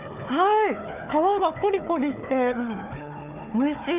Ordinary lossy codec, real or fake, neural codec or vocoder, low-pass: none; fake; codec, 16 kHz, 4 kbps, FunCodec, trained on Chinese and English, 50 frames a second; 3.6 kHz